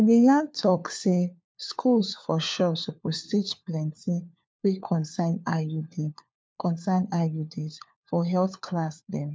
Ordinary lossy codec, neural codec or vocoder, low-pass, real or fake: none; codec, 16 kHz, 4 kbps, FunCodec, trained on LibriTTS, 50 frames a second; none; fake